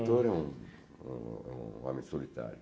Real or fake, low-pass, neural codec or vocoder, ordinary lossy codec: real; none; none; none